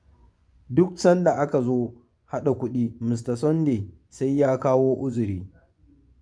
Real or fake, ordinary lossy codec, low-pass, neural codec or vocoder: fake; none; 9.9 kHz; vocoder, 24 kHz, 100 mel bands, Vocos